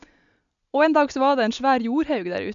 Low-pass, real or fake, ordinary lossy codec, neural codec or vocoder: 7.2 kHz; real; MP3, 96 kbps; none